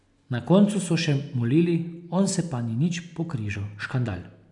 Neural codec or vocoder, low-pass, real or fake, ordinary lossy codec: none; 10.8 kHz; real; none